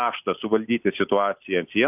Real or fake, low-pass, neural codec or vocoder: real; 3.6 kHz; none